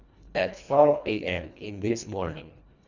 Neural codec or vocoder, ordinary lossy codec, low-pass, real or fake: codec, 24 kHz, 1.5 kbps, HILCodec; none; 7.2 kHz; fake